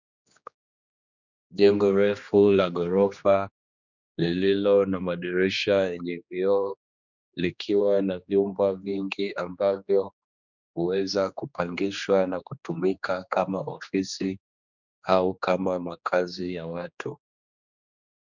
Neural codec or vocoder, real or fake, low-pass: codec, 16 kHz, 2 kbps, X-Codec, HuBERT features, trained on general audio; fake; 7.2 kHz